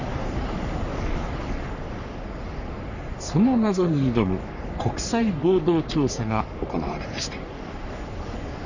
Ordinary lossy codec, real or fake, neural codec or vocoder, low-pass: none; fake; codec, 44.1 kHz, 3.4 kbps, Pupu-Codec; 7.2 kHz